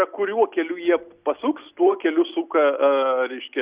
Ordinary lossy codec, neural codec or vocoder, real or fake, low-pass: Opus, 64 kbps; none; real; 3.6 kHz